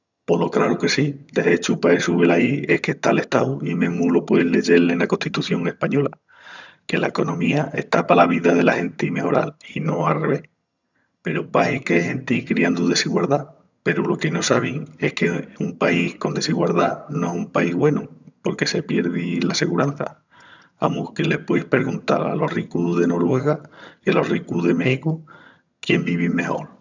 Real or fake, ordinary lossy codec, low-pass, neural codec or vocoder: fake; none; 7.2 kHz; vocoder, 22.05 kHz, 80 mel bands, HiFi-GAN